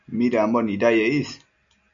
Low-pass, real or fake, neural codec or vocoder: 7.2 kHz; real; none